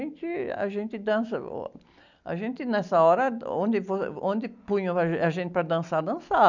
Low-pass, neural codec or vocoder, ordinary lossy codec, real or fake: 7.2 kHz; none; none; real